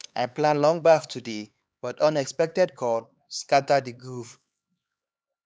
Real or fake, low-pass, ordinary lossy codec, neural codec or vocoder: fake; none; none; codec, 16 kHz, 4 kbps, X-Codec, HuBERT features, trained on LibriSpeech